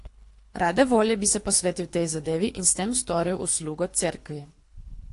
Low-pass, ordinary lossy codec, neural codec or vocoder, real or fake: 10.8 kHz; AAC, 48 kbps; codec, 24 kHz, 3 kbps, HILCodec; fake